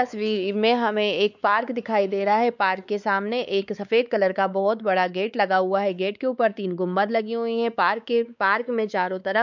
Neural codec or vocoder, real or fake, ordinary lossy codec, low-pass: codec, 16 kHz, 4 kbps, X-Codec, WavLM features, trained on Multilingual LibriSpeech; fake; none; 7.2 kHz